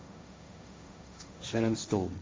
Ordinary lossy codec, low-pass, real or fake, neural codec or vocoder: none; none; fake; codec, 16 kHz, 1.1 kbps, Voila-Tokenizer